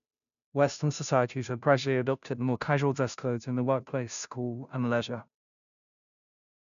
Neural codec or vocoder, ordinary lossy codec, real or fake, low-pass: codec, 16 kHz, 0.5 kbps, FunCodec, trained on Chinese and English, 25 frames a second; none; fake; 7.2 kHz